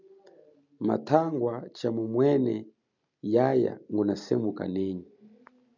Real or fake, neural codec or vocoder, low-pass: real; none; 7.2 kHz